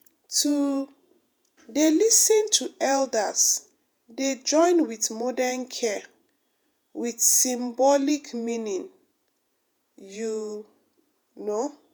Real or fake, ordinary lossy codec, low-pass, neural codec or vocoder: fake; none; none; vocoder, 48 kHz, 128 mel bands, Vocos